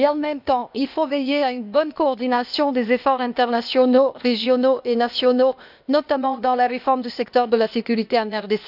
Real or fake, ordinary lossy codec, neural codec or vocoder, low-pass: fake; none; codec, 16 kHz, 0.8 kbps, ZipCodec; 5.4 kHz